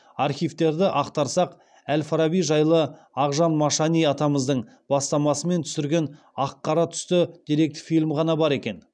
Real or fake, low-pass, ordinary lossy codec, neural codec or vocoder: real; 9.9 kHz; none; none